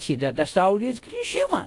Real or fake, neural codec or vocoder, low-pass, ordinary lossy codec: fake; codec, 24 kHz, 0.5 kbps, DualCodec; 10.8 kHz; AAC, 64 kbps